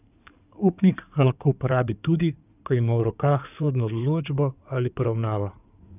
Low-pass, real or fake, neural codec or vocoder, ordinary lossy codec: 3.6 kHz; fake; codec, 16 kHz in and 24 kHz out, 2.2 kbps, FireRedTTS-2 codec; none